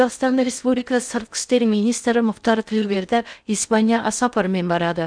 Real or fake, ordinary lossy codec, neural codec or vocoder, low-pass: fake; none; codec, 16 kHz in and 24 kHz out, 0.6 kbps, FocalCodec, streaming, 2048 codes; 9.9 kHz